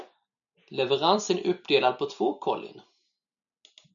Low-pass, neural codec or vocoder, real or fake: 7.2 kHz; none; real